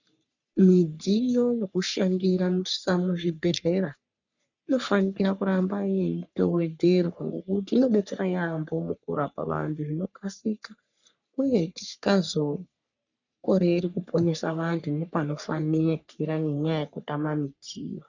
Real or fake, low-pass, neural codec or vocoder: fake; 7.2 kHz; codec, 44.1 kHz, 3.4 kbps, Pupu-Codec